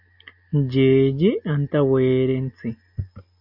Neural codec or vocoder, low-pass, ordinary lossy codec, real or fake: none; 5.4 kHz; AAC, 48 kbps; real